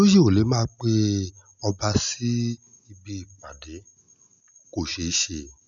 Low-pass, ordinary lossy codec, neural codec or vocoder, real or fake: 7.2 kHz; none; none; real